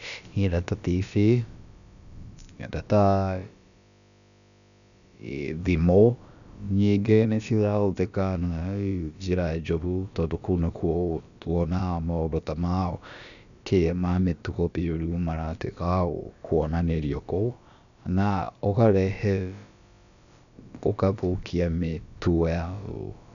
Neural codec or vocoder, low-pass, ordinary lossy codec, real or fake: codec, 16 kHz, about 1 kbps, DyCAST, with the encoder's durations; 7.2 kHz; none; fake